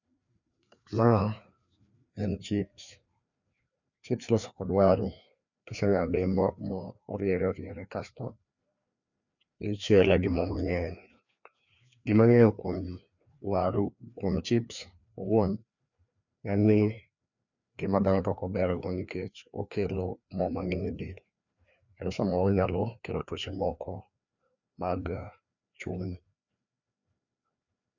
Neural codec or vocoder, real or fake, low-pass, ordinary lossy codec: codec, 16 kHz, 2 kbps, FreqCodec, larger model; fake; 7.2 kHz; none